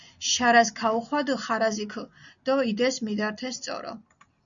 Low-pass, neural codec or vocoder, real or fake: 7.2 kHz; none; real